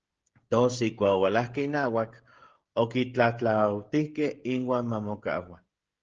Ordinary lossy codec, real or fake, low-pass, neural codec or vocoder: Opus, 16 kbps; fake; 7.2 kHz; codec, 16 kHz, 16 kbps, FreqCodec, smaller model